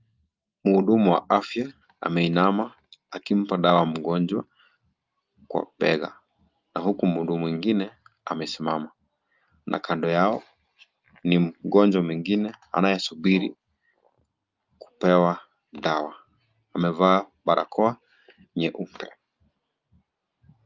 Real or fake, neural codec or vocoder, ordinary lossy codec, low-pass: real; none; Opus, 24 kbps; 7.2 kHz